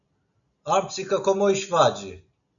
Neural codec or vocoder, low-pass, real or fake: none; 7.2 kHz; real